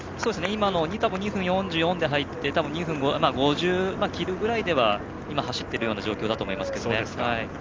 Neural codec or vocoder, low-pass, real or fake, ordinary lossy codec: none; 7.2 kHz; real; Opus, 32 kbps